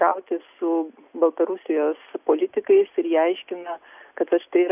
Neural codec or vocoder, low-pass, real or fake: none; 3.6 kHz; real